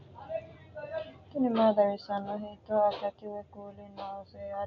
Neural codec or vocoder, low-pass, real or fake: none; 7.2 kHz; real